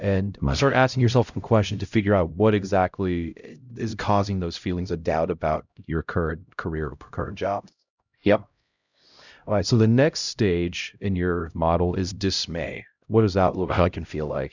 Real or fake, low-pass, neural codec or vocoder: fake; 7.2 kHz; codec, 16 kHz, 0.5 kbps, X-Codec, HuBERT features, trained on LibriSpeech